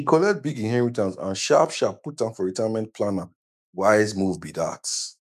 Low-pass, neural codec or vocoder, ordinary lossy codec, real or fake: 14.4 kHz; autoencoder, 48 kHz, 128 numbers a frame, DAC-VAE, trained on Japanese speech; none; fake